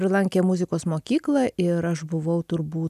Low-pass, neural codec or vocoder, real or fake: 14.4 kHz; none; real